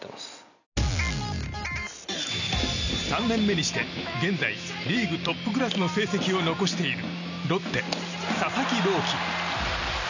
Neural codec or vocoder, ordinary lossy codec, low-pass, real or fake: none; none; 7.2 kHz; real